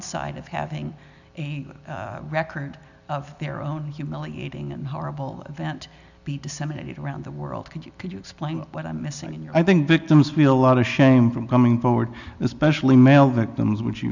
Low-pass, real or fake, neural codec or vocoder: 7.2 kHz; real; none